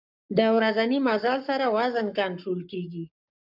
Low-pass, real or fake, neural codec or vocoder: 5.4 kHz; fake; codec, 44.1 kHz, 7.8 kbps, Pupu-Codec